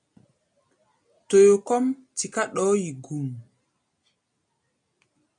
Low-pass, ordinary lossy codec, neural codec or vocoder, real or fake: 9.9 kHz; MP3, 96 kbps; none; real